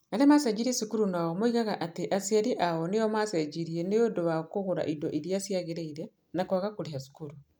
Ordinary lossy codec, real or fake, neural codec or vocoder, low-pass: none; real; none; none